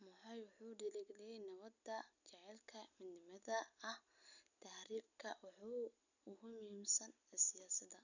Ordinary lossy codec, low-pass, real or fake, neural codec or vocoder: none; 7.2 kHz; real; none